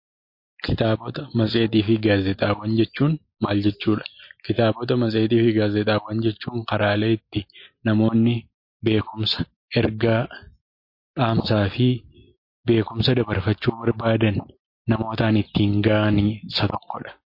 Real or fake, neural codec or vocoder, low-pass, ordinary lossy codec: real; none; 5.4 kHz; MP3, 32 kbps